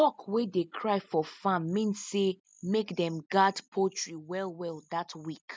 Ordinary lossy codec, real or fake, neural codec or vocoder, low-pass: none; real; none; none